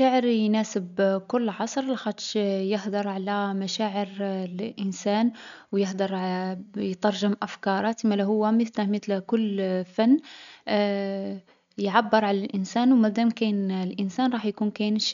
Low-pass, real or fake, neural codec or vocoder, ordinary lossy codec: 7.2 kHz; real; none; none